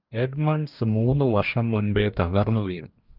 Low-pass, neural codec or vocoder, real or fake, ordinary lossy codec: 5.4 kHz; codec, 44.1 kHz, 2.6 kbps, DAC; fake; Opus, 24 kbps